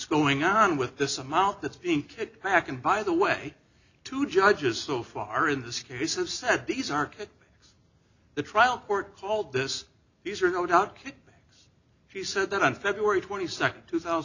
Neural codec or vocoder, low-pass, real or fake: none; 7.2 kHz; real